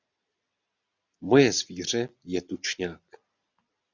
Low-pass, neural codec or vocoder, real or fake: 7.2 kHz; none; real